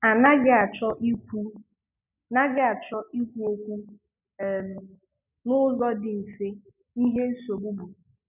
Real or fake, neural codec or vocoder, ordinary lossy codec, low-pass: real; none; none; 3.6 kHz